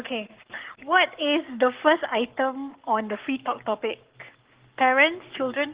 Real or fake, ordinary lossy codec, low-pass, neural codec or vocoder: fake; Opus, 16 kbps; 3.6 kHz; codec, 44.1 kHz, 7.8 kbps, Pupu-Codec